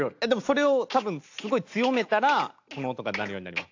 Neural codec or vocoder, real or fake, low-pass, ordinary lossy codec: none; real; 7.2 kHz; AAC, 48 kbps